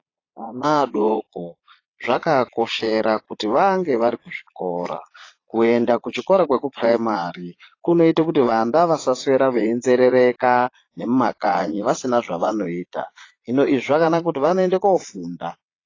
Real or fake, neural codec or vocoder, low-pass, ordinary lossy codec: fake; vocoder, 22.05 kHz, 80 mel bands, Vocos; 7.2 kHz; AAC, 32 kbps